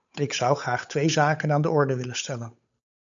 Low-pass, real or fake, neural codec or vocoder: 7.2 kHz; fake; codec, 16 kHz, 8 kbps, FunCodec, trained on Chinese and English, 25 frames a second